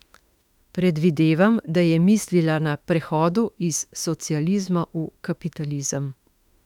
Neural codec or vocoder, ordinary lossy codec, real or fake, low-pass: autoencoder, 48 kHz, 32 numbers a frame, DAC-VAE, trained on Japanese speech; none; fake; 19.8 kHz